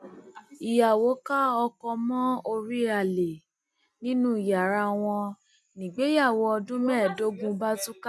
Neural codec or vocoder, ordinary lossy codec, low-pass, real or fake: none; none; none; real